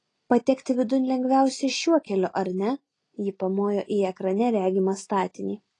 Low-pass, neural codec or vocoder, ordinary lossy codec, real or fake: 9.9 kHz; none; AAC, 32 kbps; real